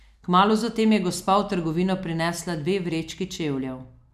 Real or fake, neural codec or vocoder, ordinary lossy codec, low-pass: real; none; none; 14.4 kHz